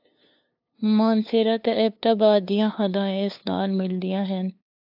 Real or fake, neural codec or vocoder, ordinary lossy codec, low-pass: fake; codec, 16 kHz, 2 kbps, FunCodec, trained on LibriTTS, 25 frames a second; AAC, 48 kbps; 5.4 kHz